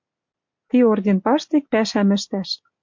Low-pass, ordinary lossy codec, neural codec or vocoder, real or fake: 7.2 kHz; MP3, 48 kbps; none; real